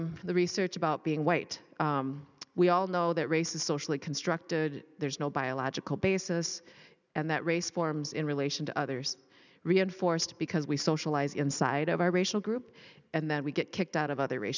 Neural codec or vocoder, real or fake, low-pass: none; real; 7.2 kHz